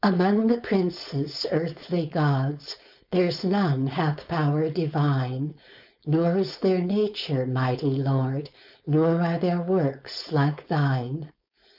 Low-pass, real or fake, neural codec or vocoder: 5.4 kHz; fake; codec, 16 kHz, 4.8 kbps, FACodec